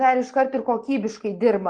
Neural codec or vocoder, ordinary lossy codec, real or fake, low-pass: none; Opus, 16 kbps; real; 9.9 kHz